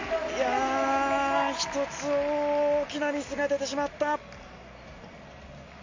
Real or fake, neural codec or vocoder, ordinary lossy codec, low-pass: real; none; MP3, 48 kbps; 7.2 kHz